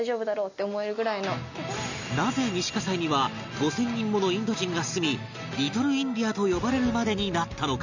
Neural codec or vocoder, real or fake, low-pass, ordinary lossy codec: none; real; 7.2 kHz; none